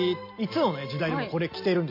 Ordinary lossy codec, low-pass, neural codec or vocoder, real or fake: MP3, 32 kbps; 5.4 kHz; none; real